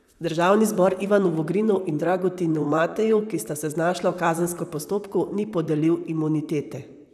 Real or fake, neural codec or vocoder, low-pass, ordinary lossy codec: fake; vocoder, 44.1 kHz, 128 mel bands, Pupu-Vocoder; 14.4 kHz; none